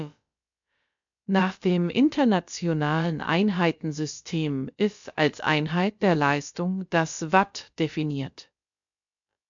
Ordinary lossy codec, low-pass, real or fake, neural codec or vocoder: MP3, 64 kbps; 7.2 kHz; fake; codec, 16 kHz, about 1 kbps, DyCAST, with the encoder's durations